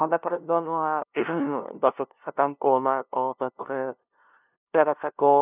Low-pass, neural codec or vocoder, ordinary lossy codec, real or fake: 3.6 kHz; codec, 16 kHz, 0.5 kbps, FunCodec, trained on LibriTTS, 25 frames a second; none; fake